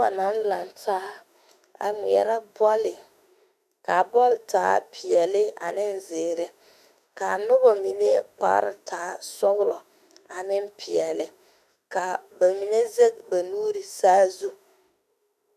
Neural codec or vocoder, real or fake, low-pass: autoencoder, 48 kHz, 32 numbers a frame, DAC-VAE, trained on Japanese speech; fake; 14.4 kHz